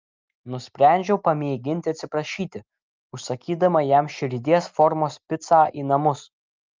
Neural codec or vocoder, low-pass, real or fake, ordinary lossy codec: none; 7.2 kHz; real; Opus, 24 kbps